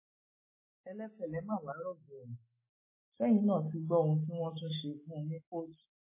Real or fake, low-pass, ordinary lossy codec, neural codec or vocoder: fake; 3.6 kHz; MP3, 16 kbps; autoencoder, 48 kHz, 128 numbers a frame, DAC-VAE, trained on Japanese speech